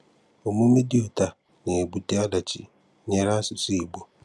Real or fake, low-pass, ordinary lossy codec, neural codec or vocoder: real; none; none; none